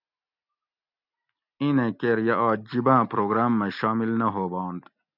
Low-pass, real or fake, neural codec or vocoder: 5.4 kHz; real; none